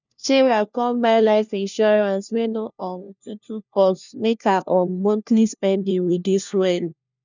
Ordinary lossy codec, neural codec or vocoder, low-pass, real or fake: none; codec, 16 kHz, 1 kbps, FunCodec, trained on LibriTTS, 50 frames a second; 7.2 kHz; fake